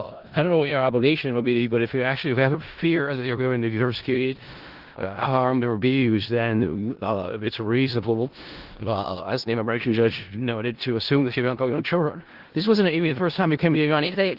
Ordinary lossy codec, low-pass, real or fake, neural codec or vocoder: Opus, 16 kbps; 5.4 kHz; fake; codec, 16 kHz in and 24 kHz out, 0.4 kbps, LongCat-Audio-Codec, four codebook decoder